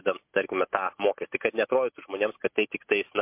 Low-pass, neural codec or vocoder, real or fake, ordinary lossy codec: 3.6 kHz; none; real; MP3, 32 kbps